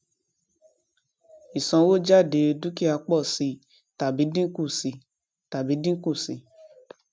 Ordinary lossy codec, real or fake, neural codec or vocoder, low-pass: none; real; none; none